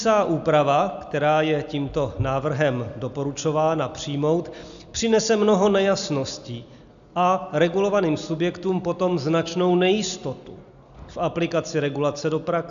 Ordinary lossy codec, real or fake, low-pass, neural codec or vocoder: AAC, 96 kbps; real; 7.2 kHz; none